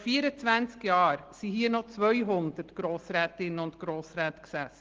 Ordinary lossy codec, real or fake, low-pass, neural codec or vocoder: Opus, 16 kbps; real; 7.2 kHz; none